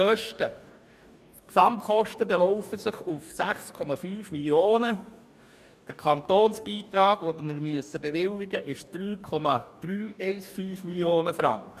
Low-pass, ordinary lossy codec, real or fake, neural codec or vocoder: 14.4 kHz; none; fake; codec, 44.1 kHz, 2.6 kbps, DAC